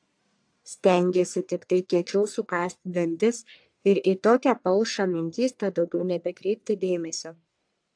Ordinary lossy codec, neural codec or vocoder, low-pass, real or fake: AAC, 64 kbps; codec, 44.1 kHz, 1.7 kbps, Pupu-Codec; 9.9 kHz; fake